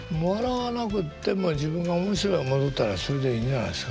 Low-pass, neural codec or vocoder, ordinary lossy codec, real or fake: none; none; none; real